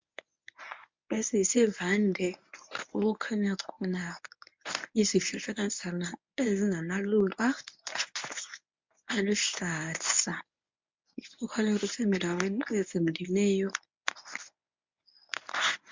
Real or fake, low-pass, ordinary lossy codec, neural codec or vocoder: fake; 7.2 kHz; MP3, 64 kbps; codec, 24 kHz, 0.9 kbps, WavTokenizer, medium speech release version 1